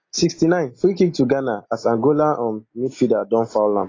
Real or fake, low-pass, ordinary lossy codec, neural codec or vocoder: real; 7.2 kHz; AAC, 32 kbps; none